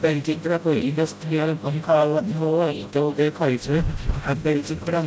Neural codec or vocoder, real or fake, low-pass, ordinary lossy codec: codec, 16 kHz, 0.5 kbps, FreqCodec, smaller model; fake; none; none